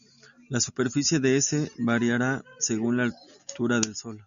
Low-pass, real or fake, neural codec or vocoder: 7.2 kHz; real; none